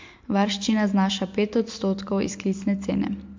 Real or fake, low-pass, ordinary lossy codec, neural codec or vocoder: real; 7.2 kHz; MP3, 48 kbps; none